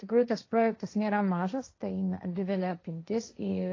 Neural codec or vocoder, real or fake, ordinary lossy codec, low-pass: codec, 16 kHz, 1.1 kbps, Voila-Tokenizer; fake; AAC, 32 kbps; 7.2 kHz